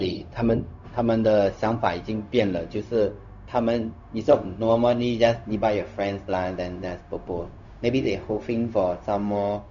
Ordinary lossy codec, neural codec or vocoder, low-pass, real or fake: none; codec, 16 kHz, 0.4 kbps, LongCat-Audio-Codec; 7.2 kHz; fake